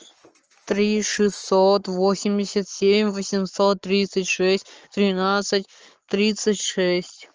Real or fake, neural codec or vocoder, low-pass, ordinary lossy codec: fake; codec, 44.1 kHz, 7.8 kbps, Pupu-Codec; 7.2 kHz; Opus, 24 kbps